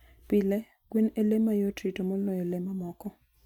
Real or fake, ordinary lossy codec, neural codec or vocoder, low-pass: real; none; none; 19.8 kHz